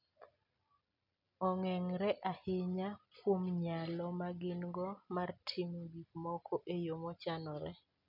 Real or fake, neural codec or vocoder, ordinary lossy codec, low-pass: real; none; none; 5.4 kHz